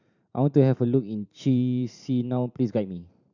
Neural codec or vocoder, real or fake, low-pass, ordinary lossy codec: vocoder, 44.1 kHz, 128 mel bands every 512 samples, BigVGAN v2; fake; 7.2 kHz; none